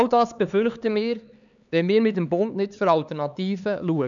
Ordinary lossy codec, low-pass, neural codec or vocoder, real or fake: none; 7.2 kHz; codec, 16 kHz, 4 kbps, X-Codec, HuBERT features, trained on LibriSpeech; fake